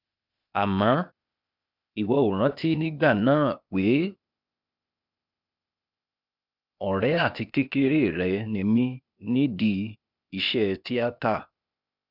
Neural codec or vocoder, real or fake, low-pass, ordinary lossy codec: codec, 16 kHz, 0.8 kbps, ZipCodec; fake; 5.4 kHz; none